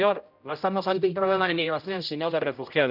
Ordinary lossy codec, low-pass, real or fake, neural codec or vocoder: none; 5.4 kHz; fake; codec, 16 kHz, 0.5 kbps, X-Codec, HuBERT features, trained on general audio